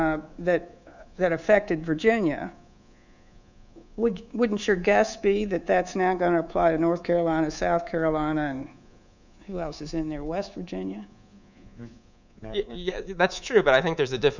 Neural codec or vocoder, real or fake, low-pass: codec, 24 kHz, 3.1 kbps, DualCodec; fake; 7.2 kHz